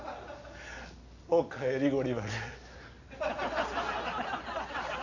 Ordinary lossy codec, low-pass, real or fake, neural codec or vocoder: none; 7.2 kHz; real; none